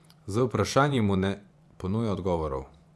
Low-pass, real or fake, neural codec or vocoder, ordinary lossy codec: none; fake; vocoder, 24 kHz, 100 mel bands, Vocos; none